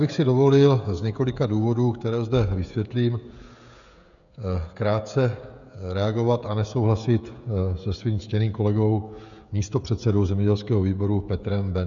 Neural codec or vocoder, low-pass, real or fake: codec, 16 kHz, 16 kbps, FreqCodec, smaller model; 7.2 kHz; fake